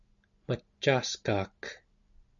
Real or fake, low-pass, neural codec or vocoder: real; 7.2 kHz; none